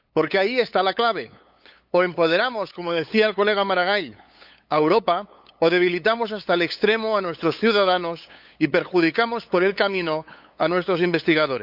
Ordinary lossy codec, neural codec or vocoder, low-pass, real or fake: none; codec, 16 kHz, 8 kbps, FunCodec, trained on LibriTTS, 25 frames a second; 5.4 kHz; fake